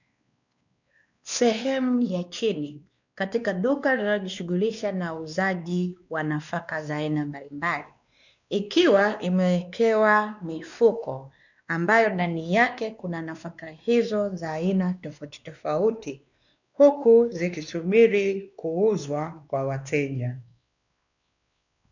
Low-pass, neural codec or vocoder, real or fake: 7.2 kHz; codec, 16 kHz, 2 kbps, X-Codec, WavLM features, trained on Multilingual LibriSpeech; fake